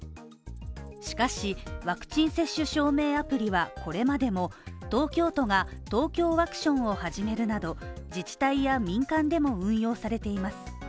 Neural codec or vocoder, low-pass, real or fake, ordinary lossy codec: none; none; real; none